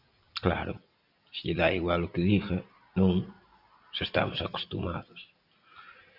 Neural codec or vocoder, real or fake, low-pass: none; real; 5.4 kHz